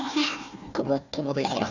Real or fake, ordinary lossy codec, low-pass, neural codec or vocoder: fake; none; 7.2 kHz; codec, 16 kHz, 1 kbps, FunCodec, trained on Chinese and English, 50 frames a second